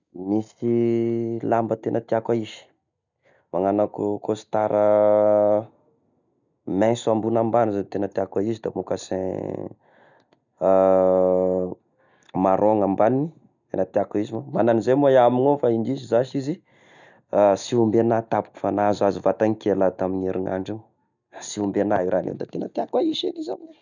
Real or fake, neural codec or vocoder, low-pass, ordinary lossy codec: real; none; 7.2 kHz; none